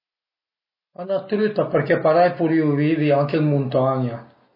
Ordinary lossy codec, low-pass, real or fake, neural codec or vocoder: MP3, 24 kbps; 5.4 kHz; real; none